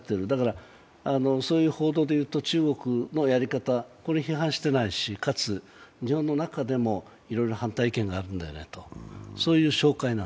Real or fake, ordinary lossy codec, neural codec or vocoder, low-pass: real; none; none; none